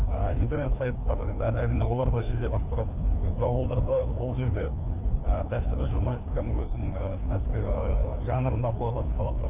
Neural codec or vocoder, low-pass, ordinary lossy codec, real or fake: codec, 16 kHz, 2 kbps, FreqCodec, larger model; 3.6 kHz; none; fake